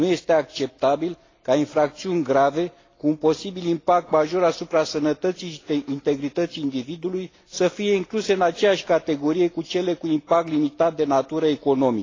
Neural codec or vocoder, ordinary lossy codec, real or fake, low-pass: none; AAC, 32 kbps; real; 7.2 kHz